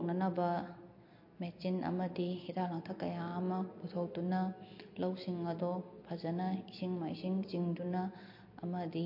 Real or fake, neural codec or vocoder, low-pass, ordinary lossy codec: real; none; 5.4 kHz; MP3, 32 kbps